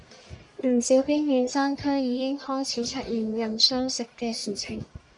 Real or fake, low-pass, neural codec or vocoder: fake; 10.8 kHz; codec, 44.1 kHz, 1.7 kbps, Pupu-Codec